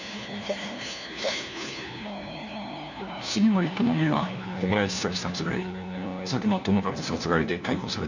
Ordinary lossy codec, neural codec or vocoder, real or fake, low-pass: none; codec, 16 kHz, 1 kbps, FunCodec, trained on LibriTTS, 50 frames a second; fake; 7.2 kHz